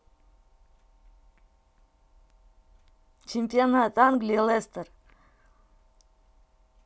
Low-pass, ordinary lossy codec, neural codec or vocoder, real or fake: none; none; none; real